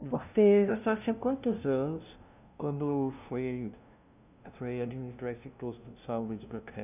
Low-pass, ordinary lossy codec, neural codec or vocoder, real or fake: 3.6 kHz; none; codec, 16 kHz, 0.5 kbps, FunCodec, trained on LibriTTS, 25 frames a second; fake